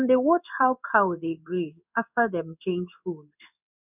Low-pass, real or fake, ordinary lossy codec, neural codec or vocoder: 3.6 kHz; fake; none; codec, 16 kHz in and 24 kHz out, 1 kbps, XY-Tokenizer